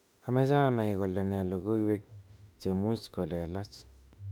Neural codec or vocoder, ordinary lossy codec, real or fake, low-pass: autoencoder, 48 kHz, 32 numbers a frame, DAC-VAE, trained on Japanese speech; none; fake; 19.8 kHz